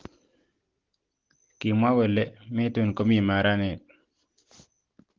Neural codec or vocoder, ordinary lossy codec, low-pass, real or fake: none; Opus, 16 kbps; 7.2 kHz; real